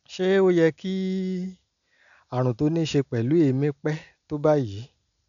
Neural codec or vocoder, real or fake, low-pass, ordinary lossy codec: none; real; 7.2 kHz; none